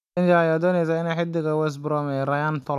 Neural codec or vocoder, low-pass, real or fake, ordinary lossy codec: none; 14.4 kHz; real; none